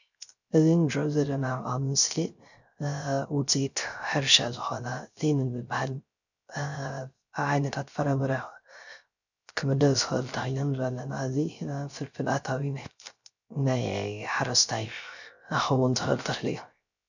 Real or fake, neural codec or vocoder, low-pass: fake; codec, 16 kHz, 0.3 kbps, FocalCodec; 7.2 kHz